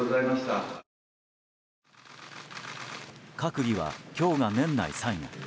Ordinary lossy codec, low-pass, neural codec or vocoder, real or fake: none; none; none; real